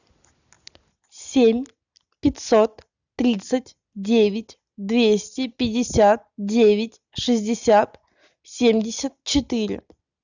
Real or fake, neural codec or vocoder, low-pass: real; none; 7.2 kHz